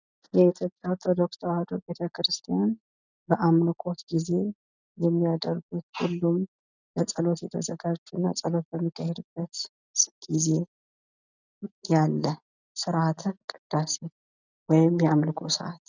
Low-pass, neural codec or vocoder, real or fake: 7.2 kHz; none; real